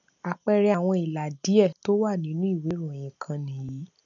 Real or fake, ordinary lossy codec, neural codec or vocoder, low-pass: real; none; none; 7.2 kHz